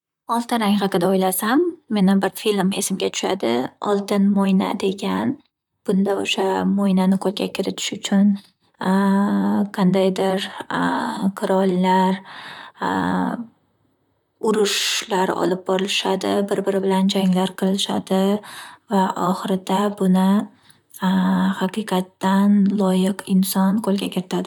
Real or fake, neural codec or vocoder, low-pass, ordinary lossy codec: fake; vocoder, 44.1 kHz, 128 mel bands, Pupu-Vocoder; 19.8 kHz; none